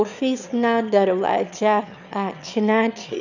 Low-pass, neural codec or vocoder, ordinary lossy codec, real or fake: 7.2 kHz; autoencoder, 22.05 kHz, a latent of 192 numbers a frame, VITS, trained on one speaker; none; fake